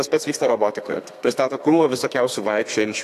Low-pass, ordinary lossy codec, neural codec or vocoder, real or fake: 14.4 kHz; AAC, 64 kbps; codec, 32 kHz, 1.9 kbps, SNAC; fake